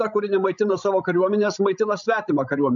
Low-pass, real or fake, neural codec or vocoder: 7.2 kHz; fake; codec, 16 kHz, 16 kbps, FreqCodec, larger model